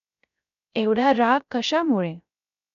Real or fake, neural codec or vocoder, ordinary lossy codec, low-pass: fake; codec, 16 kHz, 0.3 kbps, FocalCodec; none; 7.2 kHz